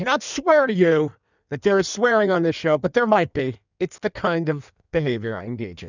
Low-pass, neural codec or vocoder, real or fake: 7.2 kHz; codec, 16 kHz in and 24 kHz out, 1.1 kbps, FireRedTTS-2 codec; fake